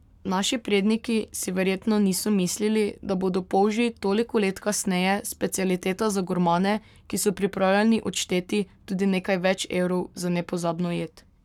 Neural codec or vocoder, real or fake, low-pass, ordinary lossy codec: codec, 44.1 kHz, 7.8 kbps, Pupu-Codec; fake; 19.8 kHz; none